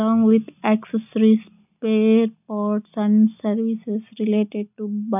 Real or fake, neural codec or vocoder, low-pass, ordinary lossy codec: real; none; 3.6 kHz; none